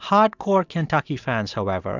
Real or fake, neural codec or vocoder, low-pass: real; none; 7.2 kHz